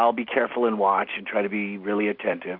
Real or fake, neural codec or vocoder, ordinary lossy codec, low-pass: real; none; Opus, 64 kbps; 5.4 kHz